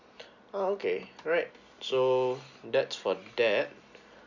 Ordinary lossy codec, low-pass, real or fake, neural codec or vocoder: none; 7.2 kHz; real; none